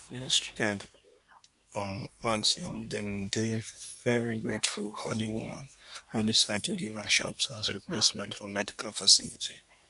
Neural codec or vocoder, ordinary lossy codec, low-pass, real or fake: codec, 24 kHz, 1 kbps, SNAC; none; 10.8 kHz; fake